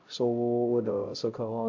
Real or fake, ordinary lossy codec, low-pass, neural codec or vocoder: fake; AAC, 48 kbps; 7.2 kHz; codec, 16 kHz, 0.5 kbps, X-Codec, HuBERT features, trained on LibriSpeech